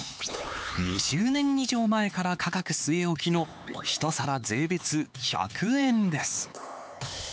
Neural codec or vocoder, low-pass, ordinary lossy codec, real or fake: codec, 16 kHz, 4 kbps, X-Codec, HuBERT features, trained on LibriSpeech; none; none; fake